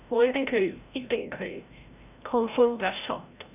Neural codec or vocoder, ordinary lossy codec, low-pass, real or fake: codec, 16 kHz, 0.5 kbps, FreqCodec, larger model; none; 3.6 kHz; fake